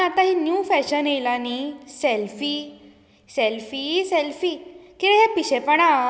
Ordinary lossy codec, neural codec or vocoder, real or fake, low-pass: none; none; real; none